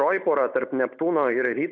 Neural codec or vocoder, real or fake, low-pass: none; real; 7.2 kHz